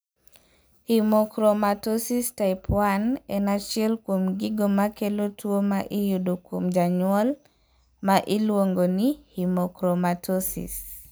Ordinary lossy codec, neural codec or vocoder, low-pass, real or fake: none; none; none; real